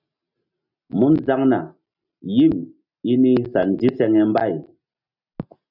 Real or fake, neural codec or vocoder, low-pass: real; none; 5.4 kHz